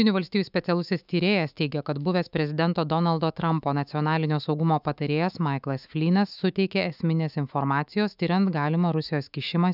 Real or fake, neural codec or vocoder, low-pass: fake; autoencoder, 48 kHz, 128 numbers a frame, DAC-VAE, trained on Japanese speech; 5.4 kHz